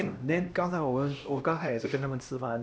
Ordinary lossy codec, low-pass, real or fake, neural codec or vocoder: none; none; fake; codec, 16 kHz, 1 kbps, X-Codec, HuBERT features, trained on LibriSpeech